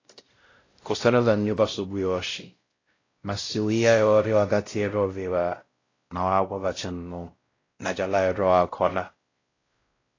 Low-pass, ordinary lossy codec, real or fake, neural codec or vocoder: 7.2 kHz; AAC, 32 kbps; fake; codec, 16 kHz, 0.5 kbps, X-Codec, WavLM features, trained on Multilingual LibriSpeech